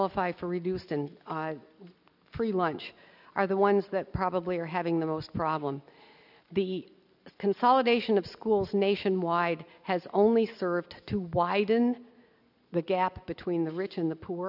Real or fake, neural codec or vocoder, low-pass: real; none; 5.4 kHz